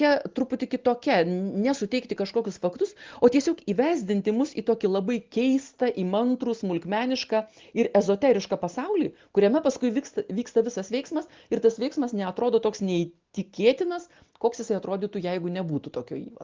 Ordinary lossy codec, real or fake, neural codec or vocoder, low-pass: Opus, 32 kbps; real; none; 7.2 kHz